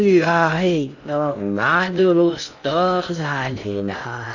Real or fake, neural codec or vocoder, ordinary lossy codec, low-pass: fake; codec, 16 kHz in and 24 kHz out, 0.6 kbps, FocalCodec, streaming, 2048 codes; none; 7.2 kHz